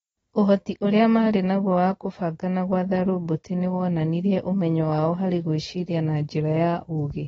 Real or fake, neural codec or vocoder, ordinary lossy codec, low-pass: real; none; AAC, 24 kbps; 10.8 kHz